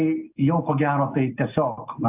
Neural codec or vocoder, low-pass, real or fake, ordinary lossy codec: none; 3.6 kHz; real; AAC, 32 kbps